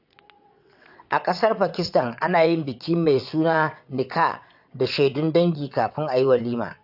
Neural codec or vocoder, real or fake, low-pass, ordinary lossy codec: vocoder, 22.05 kHz, 80 mel bands, WaveNeXt; fake; 5.4 kHz; AAC, 48 kbps